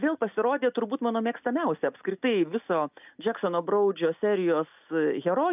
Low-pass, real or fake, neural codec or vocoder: 3.6 kHz; real; none